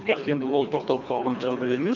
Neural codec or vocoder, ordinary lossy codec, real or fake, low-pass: codec, 24 kHz, 1.5 kbps, HILCodec; none; fake; 7.2 kHz